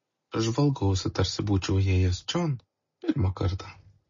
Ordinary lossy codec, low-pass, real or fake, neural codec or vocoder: MP3, 32 kbps; 7.2 kHz; real; none